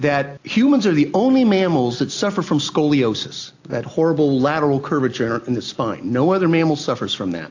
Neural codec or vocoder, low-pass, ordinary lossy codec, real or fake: none; 7.2 kHz; AAC, 48 kbps; real